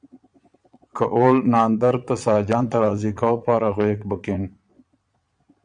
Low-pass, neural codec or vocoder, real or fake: 9.9 kHz; vocoder, 22.05 kHz, 80 mel bands, Vocos; fake